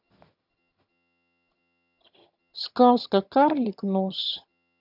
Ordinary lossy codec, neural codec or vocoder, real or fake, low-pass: none; vocoder, 22.05 kHz, 80 mel bands, HiFi-GAN; fake; 5.4 kHz